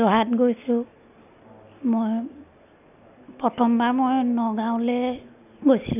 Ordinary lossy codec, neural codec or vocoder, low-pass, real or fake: none; none; 3.6 kHz; real